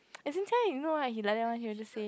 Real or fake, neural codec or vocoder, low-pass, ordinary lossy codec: real; none; none; none